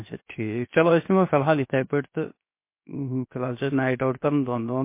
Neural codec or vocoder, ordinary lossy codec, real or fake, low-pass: codec, 16 kHz, 0.7 kbps, FocalCodec; MP3, 24 kbps; fake; 3.6 kHz